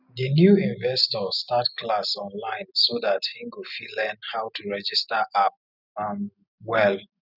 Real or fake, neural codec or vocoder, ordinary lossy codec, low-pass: real; none; none; 5.4 kHz